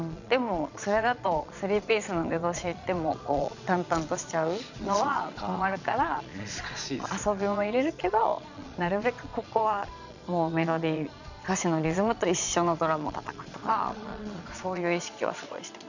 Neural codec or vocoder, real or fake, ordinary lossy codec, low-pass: vocoder, 22.05 kHz, 80 mel bands, Vocos; fake; none; 7.2 kHz